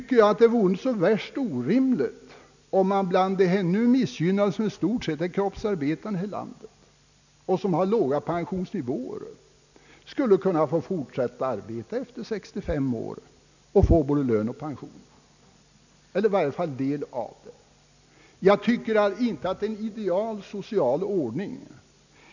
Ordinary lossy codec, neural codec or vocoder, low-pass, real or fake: none; none; 7.2 kHz; real